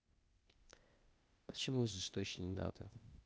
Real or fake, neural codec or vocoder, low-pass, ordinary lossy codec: fake; codec, 16 kHz, 0.8 kbps, ZipCodec; none; none